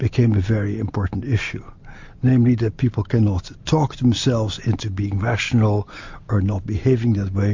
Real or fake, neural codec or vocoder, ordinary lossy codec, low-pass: real; none; MP3, 48 kbps; 7.2 kHz